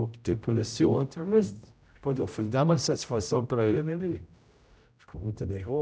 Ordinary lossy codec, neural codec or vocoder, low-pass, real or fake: none; codec, 16 kHz, 0.5 kbps, X-Codec, HuBERT features, trained on general audio; none; fake